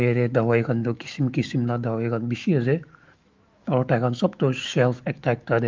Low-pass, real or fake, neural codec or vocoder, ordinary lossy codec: 7.2 kHz; fake; autoencoder, 48 kHz, 128 numbers a frame, DAC-VAE, trained on Japanese speech; Opus, 32 kbps